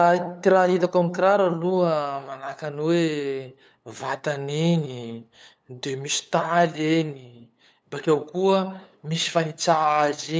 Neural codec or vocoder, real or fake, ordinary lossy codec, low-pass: codec, 16 kHz, 8 kbps, FunCodec, trained on LibriTTS, 25 frames a second; fake; none; none